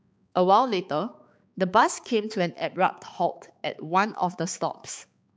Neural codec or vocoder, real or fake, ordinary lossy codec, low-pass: codec, 16 kHz, 4 kbps, X-Codec, HuBERT features, trained on balanced general audio; fake; none; none